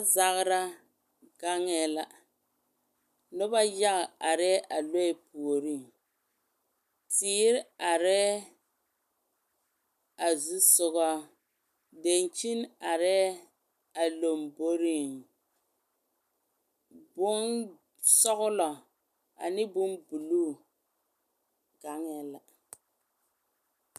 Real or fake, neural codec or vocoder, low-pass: real; none; 14.4 kHz